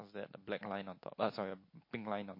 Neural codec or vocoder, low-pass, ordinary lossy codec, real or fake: none; 5.4 kHz; MP3, 32 kbps; real